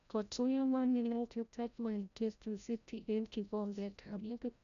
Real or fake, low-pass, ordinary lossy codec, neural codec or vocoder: fake; 7.2 kHz; none; codec, 16 kHz, 0.5 kbps, FreqCodec, larger model